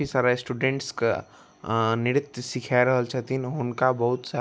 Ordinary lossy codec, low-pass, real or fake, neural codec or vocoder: none; none; real; none